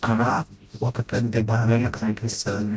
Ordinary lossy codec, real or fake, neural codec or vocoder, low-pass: none; fake; codec, 16 kHz, 0.5 kbps, FreqCodec, smaller model; none